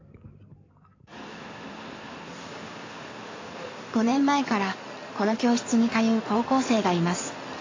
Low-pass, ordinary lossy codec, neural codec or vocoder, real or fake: 7.2 kHz; AAC, 32 kbps; codec, 16 kHz in and 24 kHz out, 2.2 kbps, FireRedTTS-2 codec; fake